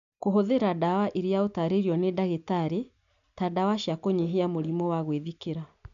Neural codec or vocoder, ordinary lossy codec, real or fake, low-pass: none; none; real; 7.2 kHz